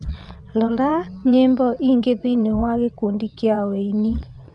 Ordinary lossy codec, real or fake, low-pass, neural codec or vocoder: none; fake; 9.9 kHz; vocoder, 22.05 kHz, 80 mel bands, WaveNeXt